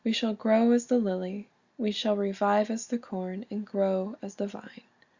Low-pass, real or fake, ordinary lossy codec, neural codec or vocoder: 7.2 kHz; real; Opus, 64 kbps; none